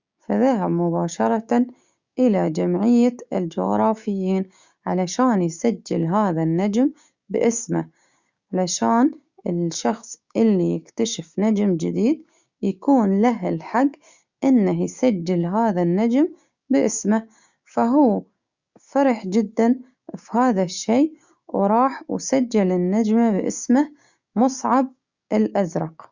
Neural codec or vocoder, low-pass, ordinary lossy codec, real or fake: codec, 16 kHz, 6 kbps, DAC; 7.2 kHz; Opus, 64 kbps; fake